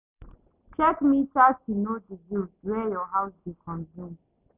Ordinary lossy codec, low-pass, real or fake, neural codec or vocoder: none; 3.6 kHz; real; none